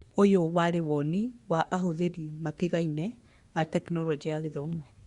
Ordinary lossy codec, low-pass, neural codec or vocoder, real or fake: Opus, 64 kbps; 10.8 kHz; codec, 24 kHz, 1 kbps, SNAC; fake